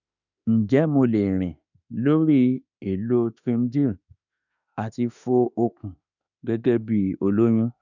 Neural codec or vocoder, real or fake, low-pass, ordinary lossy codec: autoencoder, 48 kHz, 32 numbers a frame, DAC-VAE, trained on Japanese speech; fake; 7.2 kHz; none